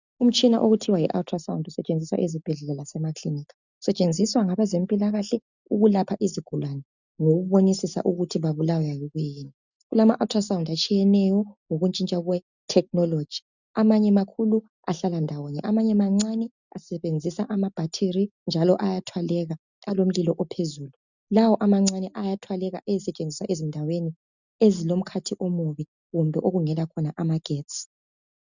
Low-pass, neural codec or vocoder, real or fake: 7.2 kHz; none; real